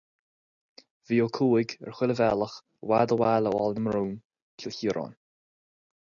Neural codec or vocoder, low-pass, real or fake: none; 7.2 kHz; real